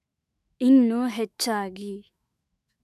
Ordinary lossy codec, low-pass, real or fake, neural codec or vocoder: none; 14.4 kHz; fake; autoencoder, 48 kHz, 128 numbers a frame, DAC-VAE, trained on Japanese speech